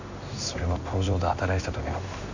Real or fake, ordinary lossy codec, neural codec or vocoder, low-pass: fake; none; codec, 16 kHz in and 24 kHz out, 1 kbps, XY-Tokenizer; 7.2 kHz